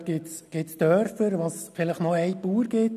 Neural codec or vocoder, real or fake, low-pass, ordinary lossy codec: none; real; 14.4 kHz; none